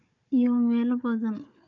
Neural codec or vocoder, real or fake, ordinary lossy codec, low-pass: codec, 16 kHz, 4 kbps, FunCodec, trained on Chinese and English, 50 frames a second; fake; none; 7.2 kHz